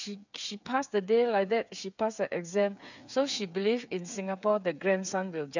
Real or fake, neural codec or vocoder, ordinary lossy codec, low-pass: fake; codec, 16 kHz, 8 kbps, FreqCodec, smaller model; none; 7.2 kHz